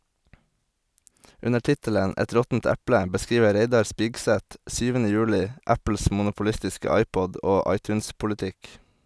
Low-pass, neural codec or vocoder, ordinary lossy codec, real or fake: none; none; none; real